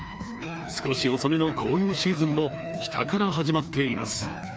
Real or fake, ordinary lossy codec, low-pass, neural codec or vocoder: fake; none; none; codec, 16 kHz, 2 kbps, FreqCodec, larger model